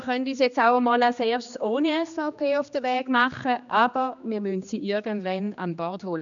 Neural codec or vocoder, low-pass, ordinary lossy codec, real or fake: codec, 16 kHz, 2 kbps, X-Codec, HuBERT features, trained on general audio; 7.2 kHz; none; fake